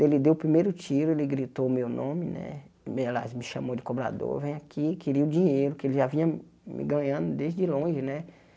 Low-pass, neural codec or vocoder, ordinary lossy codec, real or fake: none; none; none; real